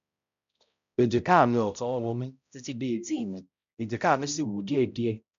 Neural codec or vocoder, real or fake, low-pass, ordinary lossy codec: codec, 16 kHz, 0.5 kbps, X-Codec, HuBERT features, trained on balanced general audio; fake; 7.2 kHz; MP3, 64 kbps